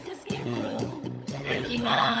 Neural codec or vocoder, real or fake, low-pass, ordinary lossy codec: codec, 16 kHz, 16 kbps, FunCodec, trained on LibriTTS, 50 frames a second; fake; none; none